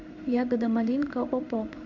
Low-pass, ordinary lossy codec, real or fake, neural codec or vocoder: 7.2 kHz; none; fake; codec, 16 kHz in and 24 kHz out, 1 kbps, XY-Tokenizer